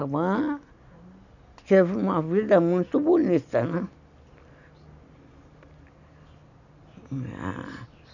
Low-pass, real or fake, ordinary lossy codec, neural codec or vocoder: 7.2 kHz; real; none; none